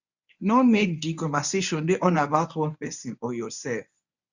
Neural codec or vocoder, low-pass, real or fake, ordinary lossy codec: codec, 24 kHz, 0.9 kbps, WavTokenizer, medium speech release version 1; 7.2 kHz; fake; none